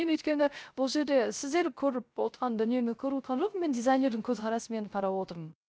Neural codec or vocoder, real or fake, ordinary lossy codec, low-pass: codec, 16 kHz, 0.3 kbps, FocalCodec; fake; none; none